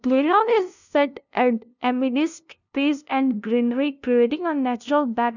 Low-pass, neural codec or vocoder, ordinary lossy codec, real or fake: 7.2 kHz; codec, 16 kHz, 0.5 kbps, FunCodec, trained on LibriTTS, 25 frames a second; none; fake